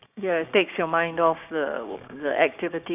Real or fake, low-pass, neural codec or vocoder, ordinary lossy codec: fake; 3.6 kHz; codec, 16 kHz, 6 kbps, DAC; none